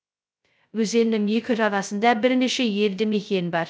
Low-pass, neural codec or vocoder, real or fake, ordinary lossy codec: none; codec, 16 kHz, 0.2 kbps, FocalCodec; fake; none